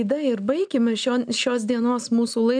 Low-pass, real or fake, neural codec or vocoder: 9.9 kHz; real; none